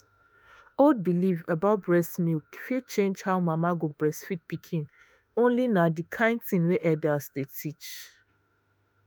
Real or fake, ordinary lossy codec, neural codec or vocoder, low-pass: fake; none; autoencoder, 48 kHz, 32 numbers a frame, DAC-VAE, trained on Japanese speech; none